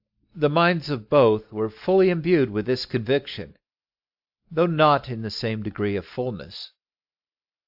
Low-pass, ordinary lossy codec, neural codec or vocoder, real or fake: 5.4 kHz; AAC, 48 kbps; none; real